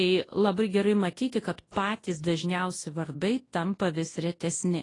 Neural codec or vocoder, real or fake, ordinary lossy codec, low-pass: codec, 24 kHz, 0.9 kbps, WavTokenizer, large speech release; fake; AAC, 32 kbps; 10.8 kHz